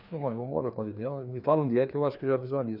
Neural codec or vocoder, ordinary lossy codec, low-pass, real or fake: codec, 16 kHz, 2 kbps, FreqCodec, larger model; none; 5.4 kHz; fake